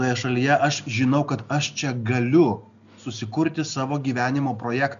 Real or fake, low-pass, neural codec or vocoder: real; 7.2 kHz; none